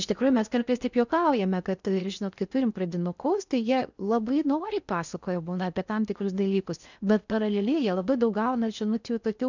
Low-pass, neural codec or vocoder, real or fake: 7.2 kHz; codec, 16 kHz in and 24 kHz out, 0.6 kbps, FocalCodec, streaming, 4096 codes; fake